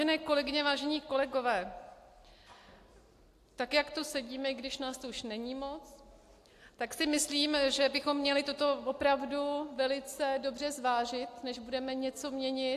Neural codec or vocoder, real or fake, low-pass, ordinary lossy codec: none; real; 14.4 kHz; AAC, 64 kbps